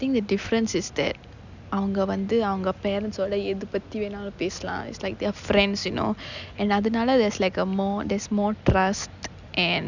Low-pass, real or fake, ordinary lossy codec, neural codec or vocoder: 7.2 kHz; real; none; none